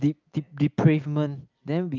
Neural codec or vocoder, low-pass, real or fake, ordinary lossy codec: none; 7.2 kHz; real; Opus, 24 kbps